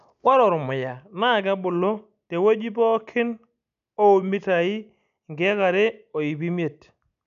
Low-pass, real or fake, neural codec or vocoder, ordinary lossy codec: 7.2 kHz; real; none; none